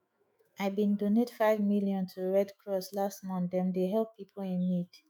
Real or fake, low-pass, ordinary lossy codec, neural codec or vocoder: fake; none; none; autoencoder, 48 kHz, 128 numbers a frame, DAC-VAE, trained on Japanese speech